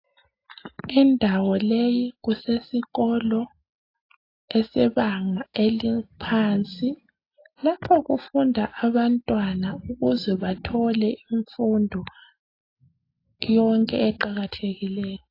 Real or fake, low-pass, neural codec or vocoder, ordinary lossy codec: real; 5.4 kHz; none; AAC, 32 kbps